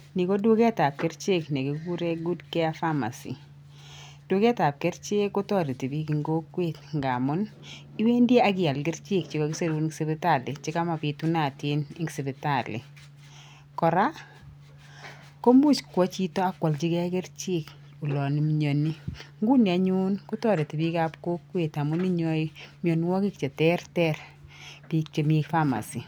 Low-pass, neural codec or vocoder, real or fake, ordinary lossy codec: none; none; real; none